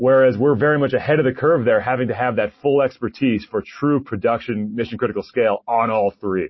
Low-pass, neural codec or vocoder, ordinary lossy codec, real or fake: 7.2 kHz; none; MP3, 24 kbps; real